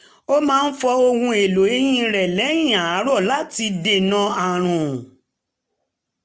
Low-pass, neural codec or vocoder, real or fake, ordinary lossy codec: none; none; real; none